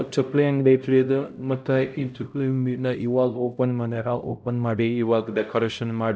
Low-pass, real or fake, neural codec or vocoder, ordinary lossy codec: none; fake; codec, 16 kHz, 0.5 kbps, X-Codec, HuBERT features, trained on LibriSpeech; none